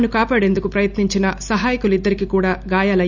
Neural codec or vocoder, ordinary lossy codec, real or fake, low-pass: none; none; real; 7.2 kHz